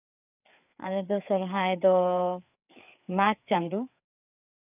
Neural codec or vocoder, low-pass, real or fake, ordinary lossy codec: codec, 16 kHz, 4 kbps, FreqCodec, larger model; 3.6 kHz; fake; none